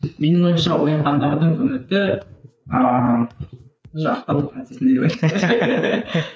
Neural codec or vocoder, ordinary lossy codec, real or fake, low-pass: codec, 16 kHz, 4 kbps, FreqCodec, larger model; none; fake; none